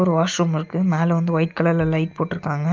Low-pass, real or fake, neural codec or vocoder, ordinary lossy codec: 7.2 kHz; real; none; Opus, 24 kbps